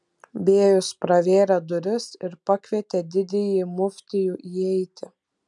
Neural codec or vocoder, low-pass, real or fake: none; 10.8 kHz; real